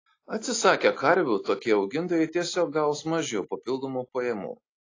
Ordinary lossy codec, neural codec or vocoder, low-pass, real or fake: AAC, 32 kbps; none; 7.2 kHz; real